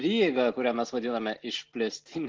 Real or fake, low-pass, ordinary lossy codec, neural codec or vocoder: real; 7.2 kHz; Opus, 16 kbps; none